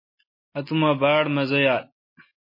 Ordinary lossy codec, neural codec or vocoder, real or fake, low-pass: MP3, 24 kbps; none; real; 5.4 kHz